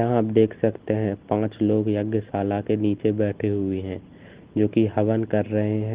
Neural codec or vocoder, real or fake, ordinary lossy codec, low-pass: none; real; Opus, 16 kbps; 3.6 kHz